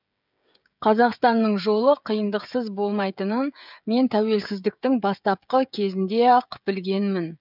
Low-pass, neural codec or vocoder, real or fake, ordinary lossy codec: 5.4 kHz; codec, 16 kHz, 16 kbps, FreqCodec, smaller model; fake; none